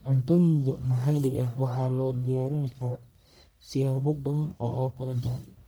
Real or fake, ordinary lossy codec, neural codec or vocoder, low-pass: fake; none; codec, 44.1 kHz, 1.7 kbps, Pupu-Codec; none